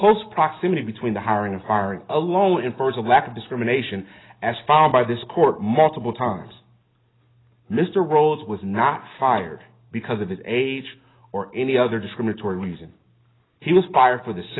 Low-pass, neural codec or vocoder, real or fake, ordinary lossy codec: 7.2 kHz; none; real; AAC, 16 kbps